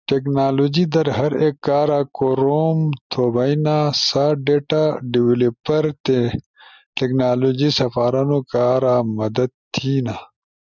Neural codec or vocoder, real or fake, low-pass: none; real; 7.2 kHz